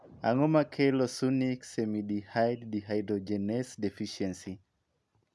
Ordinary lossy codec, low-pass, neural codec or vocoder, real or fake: none; none; none; real